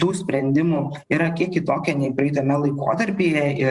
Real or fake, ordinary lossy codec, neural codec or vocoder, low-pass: fake; Opus, 64 kbps; vocoder, 44.1 kHz, 128 mel bands every 512 samples, BigVGAN v2; 10.8 kHz